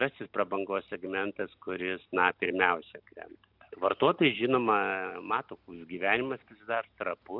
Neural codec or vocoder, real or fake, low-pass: none; real; 5.4 kHz